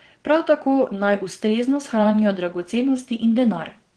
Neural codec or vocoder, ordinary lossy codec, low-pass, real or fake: vocoder, 22.05 kHz, 80 mel bands, WaveNeXt; Opus, 16 kbps; 9.9 kHz; fake